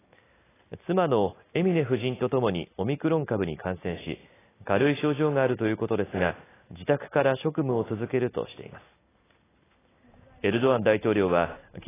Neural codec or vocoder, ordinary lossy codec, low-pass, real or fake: none; AAC, 16 kbps; 3.6 kHz; real